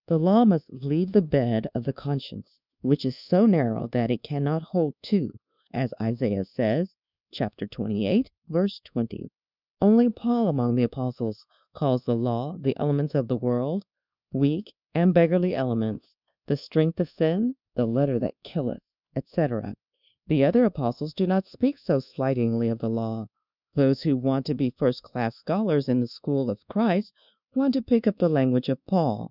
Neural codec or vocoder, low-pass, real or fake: codec, 24 kHz, 1.2 kbps, DualCodec; 5.4 kHz; fake